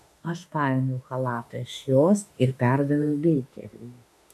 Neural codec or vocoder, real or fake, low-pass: autoencoder, 48 kHz, 32 numbers a frame, DAC-VAE, trained on Japanese speech; fake; 14.4 kHz